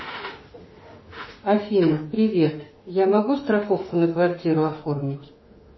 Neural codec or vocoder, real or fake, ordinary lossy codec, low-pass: autoencoder, 48 kHz, 32 numbers a frame, DAC-VAE, trained on Japanese speech; fake; MP3, 24 kbps; 7.2 kHz